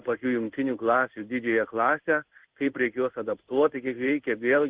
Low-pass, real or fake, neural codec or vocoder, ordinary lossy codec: 3.6 kHz; fake; codec, 16 kHz in and 24 kHz out, 1 kbps, XY-Tokenizer; Opus, 16 kbps